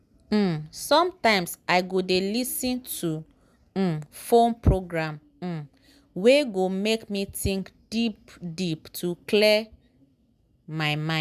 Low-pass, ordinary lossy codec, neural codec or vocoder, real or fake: 14.4 kHz; none; none; real